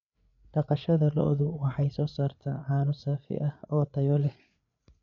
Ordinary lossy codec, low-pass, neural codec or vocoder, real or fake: none; 7.2 kHz; codec, 16 kHz, 8 kbps, FreqCodec, larger model; fake